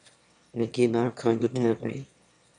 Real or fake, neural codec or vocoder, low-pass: fake; autoencoder, 22.05 kHz, a latent of 192 numbers a frame, VITS, trained on one speaker; 9.9 kHz